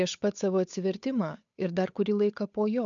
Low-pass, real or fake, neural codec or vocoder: 7.2 kHz; fake; codec, 16 kHz, 8 kbps, FunCodec, trained on Chinese and English, 25 frames a second